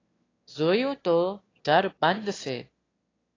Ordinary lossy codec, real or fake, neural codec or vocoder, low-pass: AAC, 32 kbps; fake; autoencoder, 22.05 kHz, a latent of 192 numbers a frame, VITS, trained on one speaker; 7.2 kHz